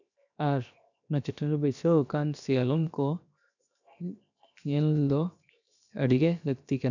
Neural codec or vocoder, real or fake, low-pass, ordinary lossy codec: codec, 16 kHz, 0.7 kbps, FocalCodec; fake; 7.2 kHz; none